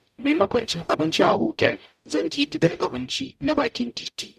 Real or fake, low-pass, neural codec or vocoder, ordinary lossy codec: fake; 14.4 kHz; codec, 44.1 kHz, 0.9 kbps, DAC; none